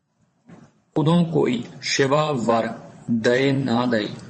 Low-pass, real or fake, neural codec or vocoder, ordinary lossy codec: 9.9 kHz; fake; vocoder, 22.05 kHz, 80 mel bands, WaveNeXt; MP3, 32 kbps